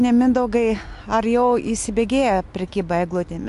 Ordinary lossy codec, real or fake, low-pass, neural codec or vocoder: AAC, 64 kbps; real; 10.8 kHz; none